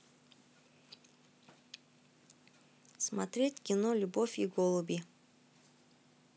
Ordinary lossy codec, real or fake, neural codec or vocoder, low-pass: none; real; none; none